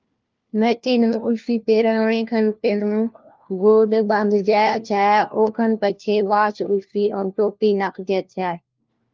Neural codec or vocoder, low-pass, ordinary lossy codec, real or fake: codec, 16 kHz, 1 kbps, FunCodec, trained on LibriTTS, 50 frames a second; 7.2 kHz; Opus, 32 kbps; fake